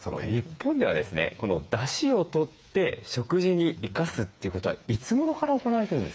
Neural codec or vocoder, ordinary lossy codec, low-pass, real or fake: codec, 16 kHz, 4 kbps, FreqCodec, smaller model; none; none; fake